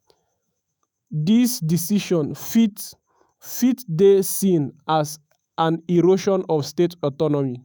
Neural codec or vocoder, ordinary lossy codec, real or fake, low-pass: autoencoder, 48 kHz, 128 numbers a frame, DAC-VAE, trained on Japanese speech; none; fake; none